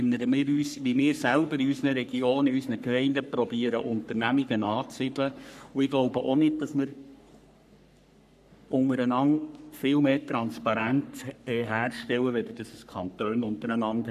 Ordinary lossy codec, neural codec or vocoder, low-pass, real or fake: none; codec, 44.1 kHz, 3.4 kbps, Pupu-Codec; 14.4 kHz; fake